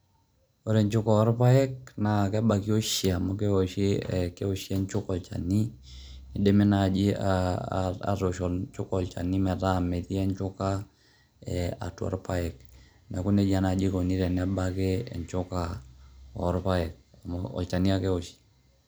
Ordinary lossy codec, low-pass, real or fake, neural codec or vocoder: none; none; real; none